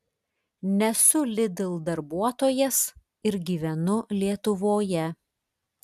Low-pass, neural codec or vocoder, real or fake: 14.4 kHz; none; real